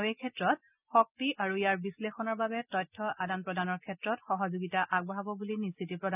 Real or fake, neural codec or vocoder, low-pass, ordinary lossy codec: real; none; 3.6 kHz; none